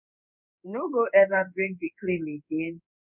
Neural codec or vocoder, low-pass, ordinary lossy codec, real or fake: codec, 16 kHz in and 24 kHz out, 1 kbps, XY-Tokenizer; 3.6 kHz; none; fake